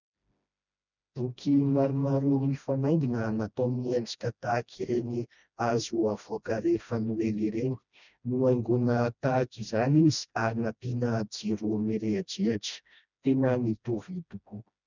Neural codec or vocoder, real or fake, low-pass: codec, 16 kHz, 1 kbps, FreqCodec, smaller model; fake; 7.2 kHz